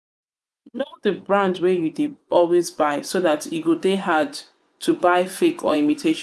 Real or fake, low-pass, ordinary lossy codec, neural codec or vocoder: fake; none; none; vocoder, 24 kHz, 100 mel bands, Vocos